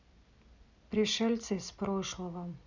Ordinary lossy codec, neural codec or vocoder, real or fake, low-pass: none; none; real; 7.2 kHz